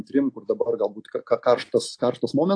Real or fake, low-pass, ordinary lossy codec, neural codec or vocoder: real; 9.9 kHz; AAC, 48 kbps; none